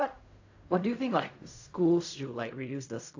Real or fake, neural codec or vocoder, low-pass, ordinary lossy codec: fake; codec, 16 kHz in and 24 kHz out, 0.4 kbps, LongCat-Audio-Codec, fine tuned four codebook decoder; 7.2 kHz; AAC, 48 kbps